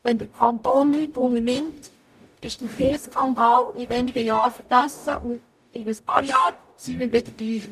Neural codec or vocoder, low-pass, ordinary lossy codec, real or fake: codec, 44.1 kHz, 0.9 kbps, DAC; 14.4 kHz; none; fake